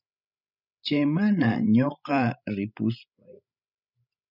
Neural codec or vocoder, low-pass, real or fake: codec, 16 kHz, 16 kbps, FreqCodec, larger model; 5.4 kHz; fake